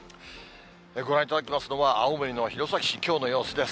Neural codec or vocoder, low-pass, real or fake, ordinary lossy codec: none; none; real; none